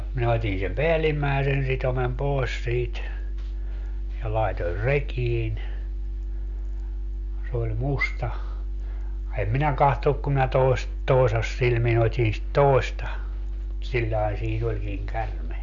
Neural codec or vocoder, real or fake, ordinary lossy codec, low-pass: none; real; Opus, 64 kbps; 7.2 kHz